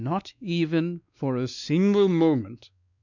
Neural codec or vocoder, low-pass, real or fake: codec, 16 kHz, 2 kbps, X-Codec, WavLM features, trained on Multilingual LibriSpeech; 7.2 kHz; fake